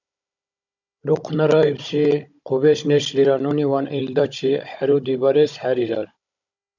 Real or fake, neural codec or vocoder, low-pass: fake; codec, 16 kHz, 16 kbps, FunCodec, trained on Chinese and English, 50 frames a second; 7.2 kHz